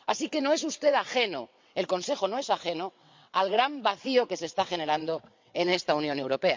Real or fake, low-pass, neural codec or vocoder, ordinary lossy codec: fake; 7.2 kHz; vocoder, 22.05 kHz, 80 mel bands, WaveNeXt; MP3, 64 kbps